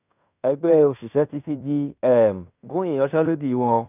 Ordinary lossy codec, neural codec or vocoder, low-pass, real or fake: none; codec, 16 kHz in and 24 kHz out, 0.9 kbps, LongCat-Audio-Codec, fine tuned four codebook decoder; 3.6 kHz; fake